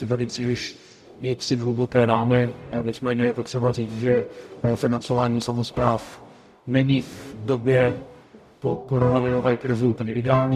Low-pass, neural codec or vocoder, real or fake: 14.4 kHz; codec, 44.1 kHz, 0.9 kbps, DAC; fake